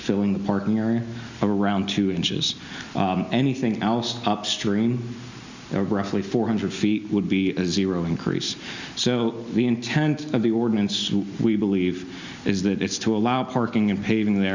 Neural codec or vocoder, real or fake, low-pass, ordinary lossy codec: none; real; 7.2 kHz; Opus, 64 kbps